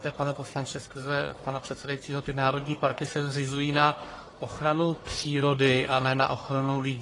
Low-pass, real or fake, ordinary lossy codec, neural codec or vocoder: 10.8 kHz; fake; AAC, 32 kbps; codec, 44.1 kHz, 1.7 kbps, Pupu-Codec